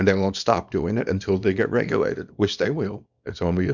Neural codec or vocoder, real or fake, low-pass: codec, 24 kHz, 0.9 kbps, WavTokenizer, small release; fake; 7.2 kHz